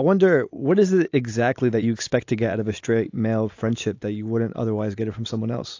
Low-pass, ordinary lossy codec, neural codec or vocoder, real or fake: 7.2 kHz; AAC, 48 kbps; none; real